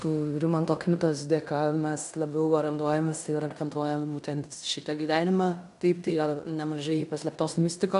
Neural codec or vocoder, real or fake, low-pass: codec, 16 kHz in and 24 kHz out, 0.9 kbps, LongCat-Audio-Codec, fine tuned four codebook decoder; fake; 10.8 kHz